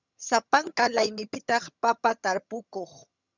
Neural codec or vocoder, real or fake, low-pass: vocoder, 22.05 kHz, 80 mel bands, HiFi-GAN; fake; 7.2 kHz